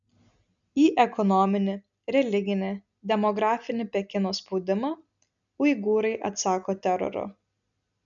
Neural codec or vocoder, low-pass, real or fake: none; 7.2 kHz; real